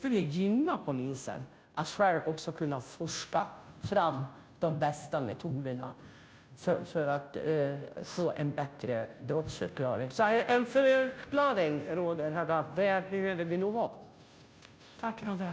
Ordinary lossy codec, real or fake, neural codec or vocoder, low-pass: none; fake; codec, 16 kHz, 0.5 kbps, FunCodec, trained on Chinese and English, 25 frames a second; none